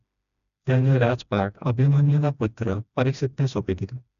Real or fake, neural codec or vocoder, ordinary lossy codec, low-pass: fake; codec, 16 kHz, 1 kbps, FreqCodec, smaller model; none; 7.2 kHz